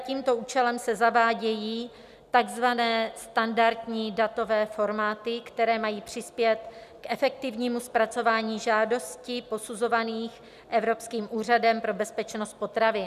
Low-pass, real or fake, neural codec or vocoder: 14.4 kHz; real; none